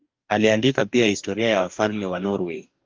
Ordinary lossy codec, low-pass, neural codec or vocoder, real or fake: Opus, 24 kbps; 7.2 kHz; codec, 44.1 kHz, 2.6 kbps, DAC; fake